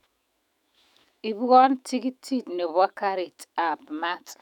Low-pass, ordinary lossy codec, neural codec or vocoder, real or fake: 19.8 kHz; none; autoencoder, 48 kHz, 128 numbers a frame, DAC-VAE, trained on Japanese speech; fake